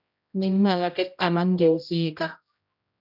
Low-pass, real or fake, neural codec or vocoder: 5.4 kHz; fake; codec, 16 kHz, 0.5 kbps, X-Codec, HuBERT features, trained on general audio